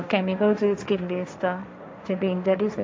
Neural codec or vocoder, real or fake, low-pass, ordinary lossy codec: codec, 16 kHz, 1.1 kbps, Voila-Tokenizer; fake; none; none